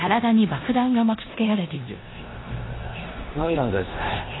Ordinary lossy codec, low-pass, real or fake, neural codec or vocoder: AAC, 16 kbps; 7.2 kHz; fake; codec, 16 kHz in and 24 kHz out, 0.9 kbps, LongCat-Audio-Codec, four codebook decoder